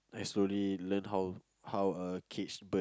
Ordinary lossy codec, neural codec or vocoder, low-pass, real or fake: none; none; none; real